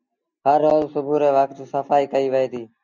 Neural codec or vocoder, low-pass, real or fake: none; 7.2 kHz; real